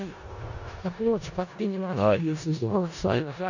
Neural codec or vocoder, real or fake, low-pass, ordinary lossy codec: codec, 16 kHz in and 24 kHz out, 0.4 kbps, LongCat-Audio-Codec, four codebook decoder; fake; 7.2 kHz; none